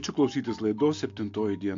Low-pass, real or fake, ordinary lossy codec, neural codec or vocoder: 7.2 kHz; real; AAC, 48 kbps; none